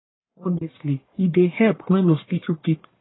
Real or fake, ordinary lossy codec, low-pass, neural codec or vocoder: fake; AAC, 16 kbps; 7.2 kHz; codec, 44.1 kHz, 1.7 kbps, Pupu-Codec